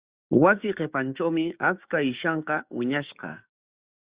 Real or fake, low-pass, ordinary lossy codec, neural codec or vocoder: fake; 3.6 kHz; Opus, 64 kbps; codec, 16 kHz, 6 kbps, DAC